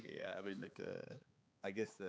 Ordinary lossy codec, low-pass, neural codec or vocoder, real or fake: none; none; codec, 16 kHz, 4 kbps, X-Codec, HuBERT features, trained on balanced general audio; fake